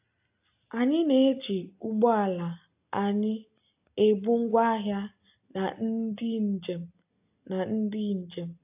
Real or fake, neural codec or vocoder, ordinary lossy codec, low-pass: real; none; AAC, 32 kbps; 3.6 kHz